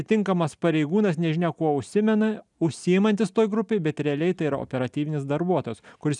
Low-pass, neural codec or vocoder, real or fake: 10.8 kHz; none; real